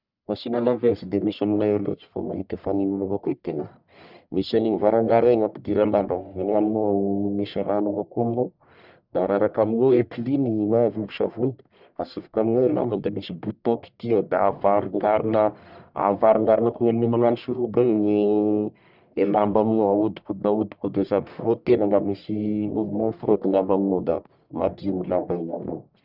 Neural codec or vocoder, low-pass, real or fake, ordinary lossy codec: codec, 44.1 kHz, 1.7 kbps, Pupu-Codec; 5.4 kHz; fake; none